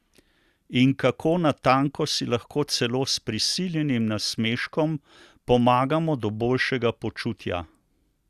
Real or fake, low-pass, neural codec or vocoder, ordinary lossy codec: real; 14.4 kHz; none; Opus, 64 kbps